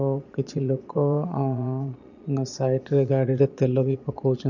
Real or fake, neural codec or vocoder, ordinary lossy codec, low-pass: fake; vocoder, 22.05 kHz, 80 mel bands, Vocos; none; 7.2 kHz